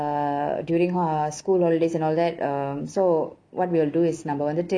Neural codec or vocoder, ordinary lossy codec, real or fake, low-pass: none; none; real; 9.9 kHz